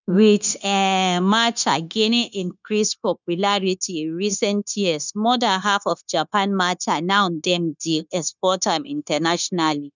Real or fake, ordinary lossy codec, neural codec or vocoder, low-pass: fake; none; codec, 16 kHz, 0.9 kbps, LongCat-Audio-Codec; 7.2 kHz